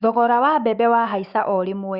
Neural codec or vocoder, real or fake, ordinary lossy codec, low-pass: autoencoder, 48 kHz, 128 numbers a frame, DAC-VAE, trained on Japanese speech; fake; Opus, 64 kbps; 5.4 kHz